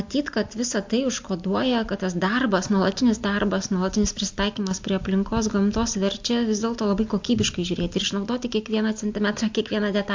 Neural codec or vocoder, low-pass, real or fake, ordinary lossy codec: none; 7.2 kHz; real; MP3, 48 kbps